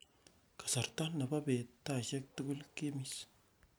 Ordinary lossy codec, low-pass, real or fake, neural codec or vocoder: none; none; real; none